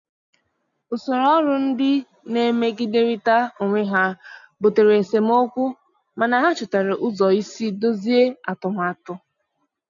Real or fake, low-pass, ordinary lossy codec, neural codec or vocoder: real; 7.2 kHz; none; none